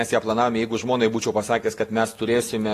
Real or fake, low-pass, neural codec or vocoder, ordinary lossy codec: real; 14.4 kHz; none; AAC, 48 kbps